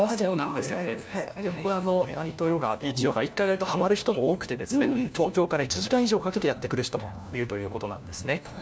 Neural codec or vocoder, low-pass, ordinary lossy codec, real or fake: codec, 16 kHz, 1 kbps, FunCodec, trained on LibriTTS, 50 frames a second; none; none; fake